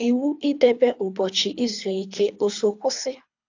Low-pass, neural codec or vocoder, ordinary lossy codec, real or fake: 7.2 kHz; codec, 24 kHz, 3 kbps, HILCodec; AAC, 48 kbps; fake